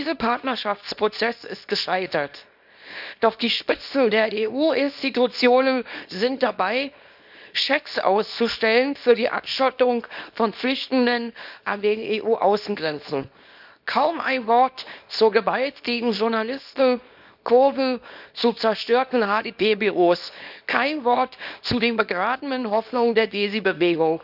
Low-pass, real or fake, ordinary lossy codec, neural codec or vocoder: 5.4 kHz; fake; none; codec, 24 kHz, 0.9 kbps, WavTokenizer, small release